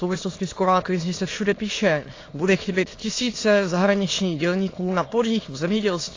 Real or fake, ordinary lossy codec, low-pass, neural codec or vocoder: fake; AAC, 32 kbps; 7.2 kHz; autoencoder, 22.05 kHz, a latent of 192 numbers a frame, VITS, trained on many speakers